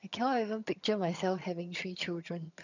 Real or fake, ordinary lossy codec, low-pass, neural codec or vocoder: fake; none; 7.2 kHz; vocoder, 22.05 kHz, 80 mel bands, HiFi-GAN